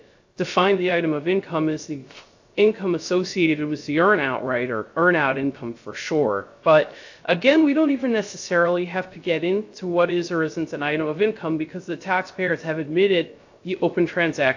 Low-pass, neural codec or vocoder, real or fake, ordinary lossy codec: 7.2 kHz; codec, 16 kHz, 0.3 kbps, FocalCodec; fake; AAC, 48 kbps